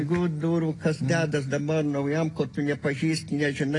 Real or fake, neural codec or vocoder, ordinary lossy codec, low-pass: real; none; AAC, 32 kbps; 10.8 kHz